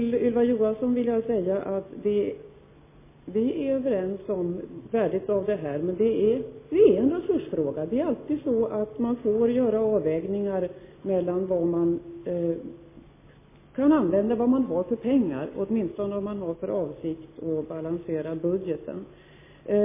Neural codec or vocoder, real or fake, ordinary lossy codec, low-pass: none; real; MP3, 16 kbps; 3.6 kHz